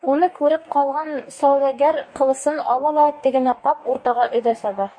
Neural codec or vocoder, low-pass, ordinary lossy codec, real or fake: codec, 44.1 kHz, 2.6 kbps, DAC; 9.9 kHz; MP3, 48 kbps; fake